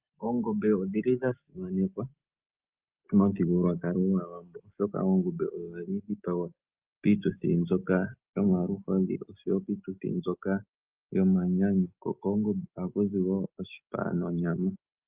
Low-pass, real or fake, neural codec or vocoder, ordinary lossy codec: 3.6 kHz; real; none; Opus, 24 kbps